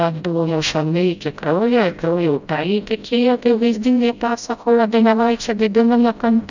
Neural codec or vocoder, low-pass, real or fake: codec, 16 kHz, 0.5 kbps, FreqCodec, smaller model; 7.2 kHz; fake